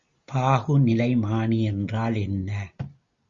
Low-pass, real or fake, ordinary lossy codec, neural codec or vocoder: 7.2 kHz; real; Opus, 64 kbps; none